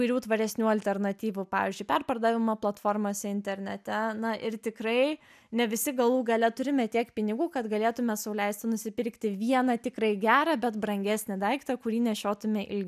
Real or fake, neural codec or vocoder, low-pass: real; none; 14.4 kHz